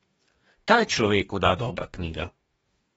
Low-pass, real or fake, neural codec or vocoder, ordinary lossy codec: 19.8 kHz; fake; codec, 44.1 kHz, 2.6 kbps, DAC; AAC, 24 kbps